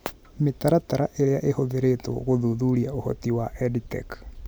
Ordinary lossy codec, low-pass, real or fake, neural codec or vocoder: none; none; real; none